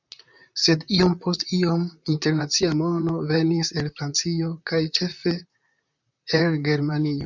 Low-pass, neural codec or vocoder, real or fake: 7.2 kHz; vocoder, 44.1 kHz, 128 mel bands, Pupu-Vocoder; fake